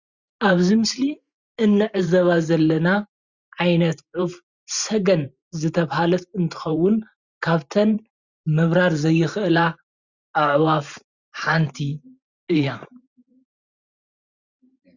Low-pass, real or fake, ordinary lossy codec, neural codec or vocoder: 7.2 kHz; fake; Opus, 64 kbps; vocoder, 44.1 kHz, 128 mel bands every 512 samples, BigVGAN v2